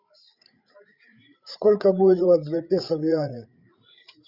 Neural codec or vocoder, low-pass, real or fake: codec, 16 kHz, 8 kbps, FreqCodec, larger model; 5.4 kHz; fake